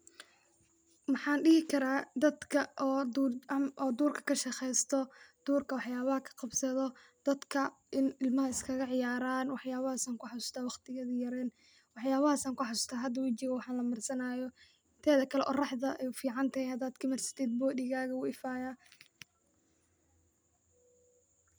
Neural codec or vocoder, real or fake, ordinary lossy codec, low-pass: none; real; none; none